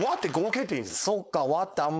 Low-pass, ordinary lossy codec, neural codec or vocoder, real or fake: none; none; codec, 16 kHz, 4.8 kbps, FACodec; fake